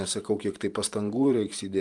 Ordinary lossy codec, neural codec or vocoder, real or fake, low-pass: Opus, 32 kbps; vocoder, 44.1 kHz, 128 mel bands every 512 samples, BigVGAN v2; fake; 10.8 kHz